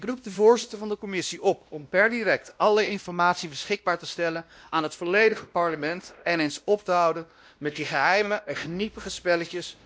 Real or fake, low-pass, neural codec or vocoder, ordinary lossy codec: fake; none; codec, 16 kHz, 1 kbps, X-Codec, WavLM features, trained on Multilingual LibriSpeech; none